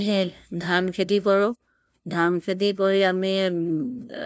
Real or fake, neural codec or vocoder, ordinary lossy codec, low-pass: fake; codec, 16 kHz, 0.5 kbps, FunCodec, trained on LibriTTS, 25 frames a second; none; none